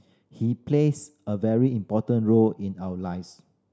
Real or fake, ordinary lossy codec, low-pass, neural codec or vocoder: real; none; none; none